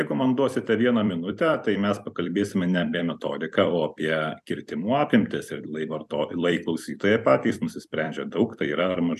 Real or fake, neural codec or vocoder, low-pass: fake; vocoder, 44.1 kHz, 128 mel bands every 256 samples, BigVGAN v2; 14.4 kHz